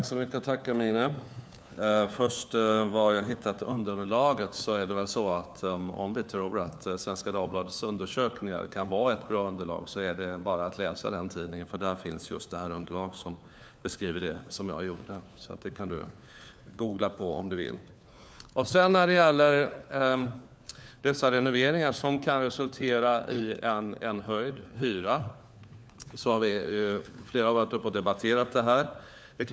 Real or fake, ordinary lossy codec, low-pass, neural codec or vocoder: fake; none; none; codec, 16 kHz, 4 kbps, FunCodec, trained on LibriTTS, 50 frames a second